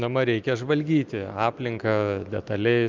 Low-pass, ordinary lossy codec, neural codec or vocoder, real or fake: 7.2 kHz; Opus, 24 kbps; none; real